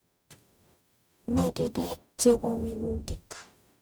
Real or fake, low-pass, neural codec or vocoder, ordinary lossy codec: fake; none; codec, 44.1 kHz, 0.9 kbps, DAC; none